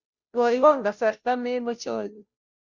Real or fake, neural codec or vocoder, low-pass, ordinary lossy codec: fake; codec, 16 kHz, 0.5 kbps, FunCodec, trained on Chinese and English, 25 frames a second; 7.2 kHz; Opus, 64 kbps